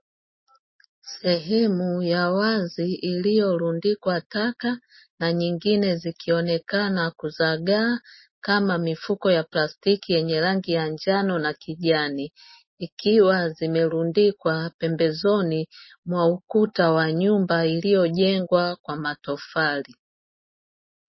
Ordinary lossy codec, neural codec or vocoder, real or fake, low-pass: MP3, 24 kbps; none; real; 7.2 kHz